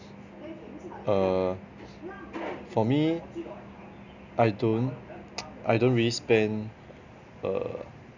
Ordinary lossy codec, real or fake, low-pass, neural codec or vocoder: none; real; 7.2 kHz; none